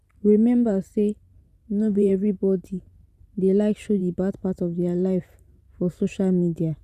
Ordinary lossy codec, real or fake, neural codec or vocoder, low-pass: none; fake; vocoder, 44.1 kHz, 128 mel bands every 512 samples, BigVGAN v2; 14.4 kHz